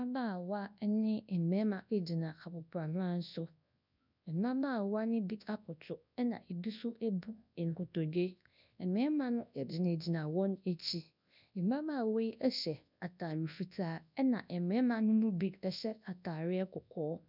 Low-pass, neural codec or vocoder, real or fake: 5.4 kHz; codec, 24 kHz, 0.9 kbps, WavTokenizer, large speech release; fake